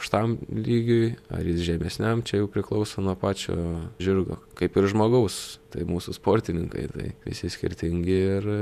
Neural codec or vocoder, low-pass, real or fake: vocoder, 48 kHz, 128 mel bands, Vocos; 14.4 kHz; fake